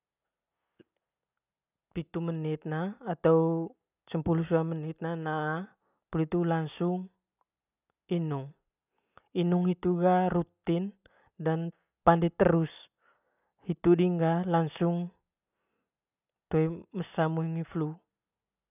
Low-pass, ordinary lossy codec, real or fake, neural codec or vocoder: 3.6 kHz; none; real; none